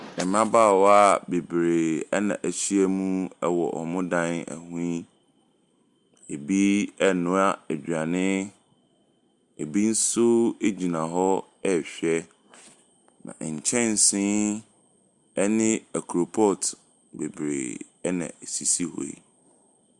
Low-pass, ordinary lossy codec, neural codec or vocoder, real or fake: 10.8 kHz; Opus, 64 kbps; none; real